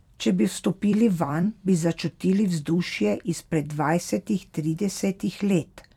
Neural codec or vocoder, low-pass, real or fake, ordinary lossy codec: vocoder, 44.1 kHz, 128 mel bands every 256 samples, BigVGAN v2; 19.8 kHz; fake; none